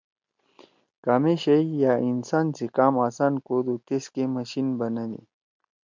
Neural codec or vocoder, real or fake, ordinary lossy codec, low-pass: none; real; MP3, 64 kbps; 7.2 kHz